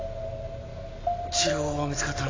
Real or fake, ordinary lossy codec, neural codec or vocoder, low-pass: real; AAC, 32 kbps; none; 7.2 kHz